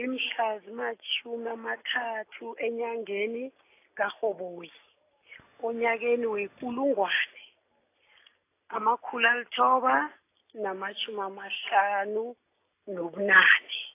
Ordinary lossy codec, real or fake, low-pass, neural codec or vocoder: AAC, 24 kbps; real; 3.6 kHz; none